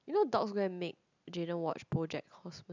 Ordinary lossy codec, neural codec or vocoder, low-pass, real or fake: none; none; 7.2 kHz; real